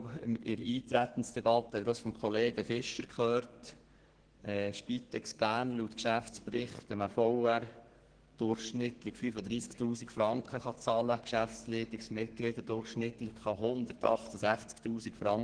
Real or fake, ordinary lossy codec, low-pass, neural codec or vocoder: fake; Opus, 16 kbps; 9.9 kHz; codec, 32 kHz, 1.9 kbps, SNAC